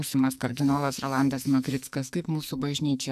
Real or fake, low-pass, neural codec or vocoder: fake; 14.4 kHz; codec, 44.1 kHz, 2.6 kbps, SNAC